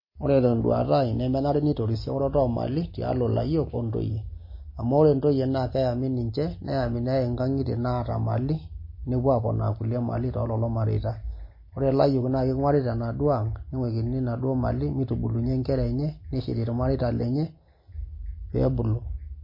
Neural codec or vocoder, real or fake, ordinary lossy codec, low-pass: none; real; MP3, 24 kbps; 5.4 kHz